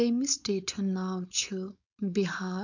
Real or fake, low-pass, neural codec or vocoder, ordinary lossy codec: fake; 7.2 kHz; codec, 16 kHz, 4.8 kbps, FACodec; none